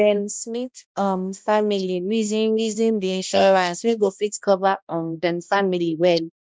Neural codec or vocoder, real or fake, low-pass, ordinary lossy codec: codec, 16 kHz, 1 kbps, X-Codec, HuBERT features, trained on balanced general audio; fake; none; none